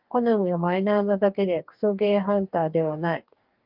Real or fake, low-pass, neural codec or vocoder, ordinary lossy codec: fake; 5.4 kHz; codec, 44.1 kHz, 2.6 kbps, DAC; Opus, 24 kbps